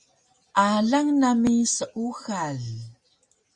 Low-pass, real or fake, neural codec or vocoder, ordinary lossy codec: 10.8 kHz; real; none; Opus, 64 kbps